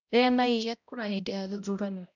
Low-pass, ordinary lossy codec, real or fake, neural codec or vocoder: 7.2 kHz; none; fake; codec, 16 kHz, 0.5 kbps, X-Codec, HuBERT features, trained on balanced general audio